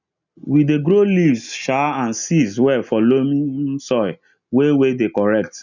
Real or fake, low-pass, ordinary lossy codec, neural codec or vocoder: real; 7.2 kHz; none; none